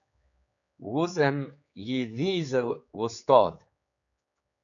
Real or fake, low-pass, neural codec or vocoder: fake; 7.2 kHz; codec, 16 kHz, 4 kbps, X-Codec, HuBERT features, trained on general audio